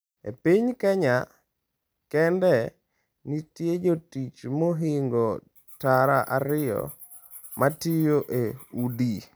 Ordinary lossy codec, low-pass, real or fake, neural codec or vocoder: none; none; real; none